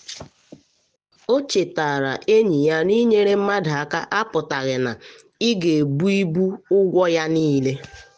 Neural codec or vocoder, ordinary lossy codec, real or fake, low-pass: none; none; real; 9.9 kHz